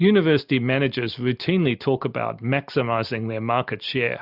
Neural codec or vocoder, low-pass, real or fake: none; 5.4 kHz; real